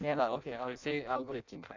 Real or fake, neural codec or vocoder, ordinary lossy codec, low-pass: fake; codec, 16 kHz in and 24 kHz out, 0.6 kbps, FireRedTTS-2 codec; none; 7.2 kHz